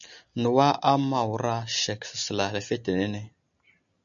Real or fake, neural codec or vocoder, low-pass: real; none; 7.2 kHz